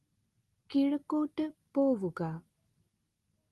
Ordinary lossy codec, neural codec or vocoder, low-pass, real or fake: Opus, 24 kbps; none; 14.4 kHz; real